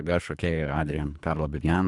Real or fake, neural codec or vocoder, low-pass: fake; codec, 24 kHz, 3 kbps, HILCodec; 10.8 kHz